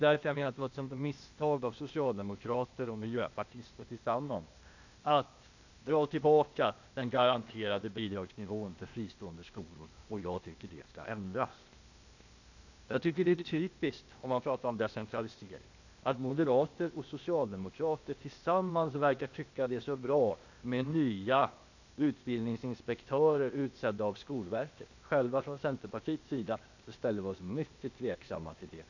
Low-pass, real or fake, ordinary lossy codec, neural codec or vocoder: 7.2 kHz; fake; none; codec, 16 kHz, 0.8 kbps, ZipCodec